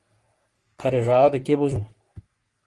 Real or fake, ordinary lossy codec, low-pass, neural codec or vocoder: fake; Opus, 32 kbps; 10.8 kHz; codec, 44.1 kHz, 3.4 kbps, Pupu-Codec